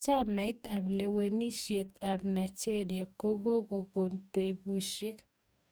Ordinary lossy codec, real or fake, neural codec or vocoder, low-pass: none; fake; codec, 44.1 kHz, 2.6 kbps, DAC; none